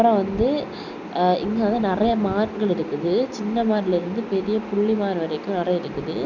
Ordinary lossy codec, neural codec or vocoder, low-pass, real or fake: none; none; 7.2 kHz; real